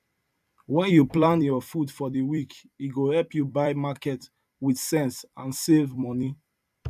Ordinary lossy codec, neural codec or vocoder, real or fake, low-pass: none; vocoder, 44.1 kHz, 128 mel bands every 256 samples, BigVGAN v2; fake; 14.4 kHz